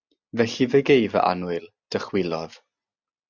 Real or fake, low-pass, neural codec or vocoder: real; 7.2 kHz; none